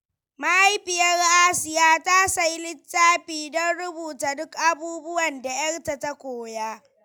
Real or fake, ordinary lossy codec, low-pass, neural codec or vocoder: real; none; none; none